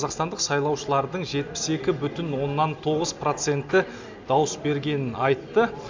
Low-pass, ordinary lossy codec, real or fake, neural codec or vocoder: 7.2 kHz; MP3, 64 kbps; real; none